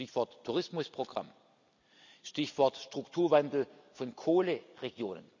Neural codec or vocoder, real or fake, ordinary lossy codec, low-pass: none; real; none; 7.2 kHz